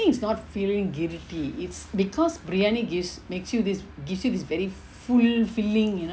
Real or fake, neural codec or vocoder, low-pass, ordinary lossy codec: real; none; none; none